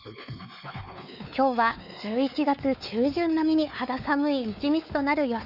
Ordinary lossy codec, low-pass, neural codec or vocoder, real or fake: none; 5.4 kHz; codec, 16 kHz, 4 kbps, X-Codec, WavLM features, trained on Multilingual LibriSpeech; fake